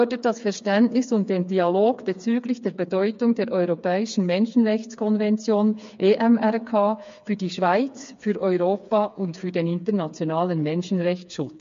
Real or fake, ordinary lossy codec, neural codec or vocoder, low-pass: fake; MP3, 48 kbps; codec, 16 kHz, 4 kbps, FreqCodec, smaller model; 7.2 kHz